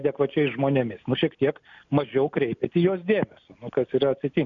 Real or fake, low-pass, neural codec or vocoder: real; 7.2 kHz; none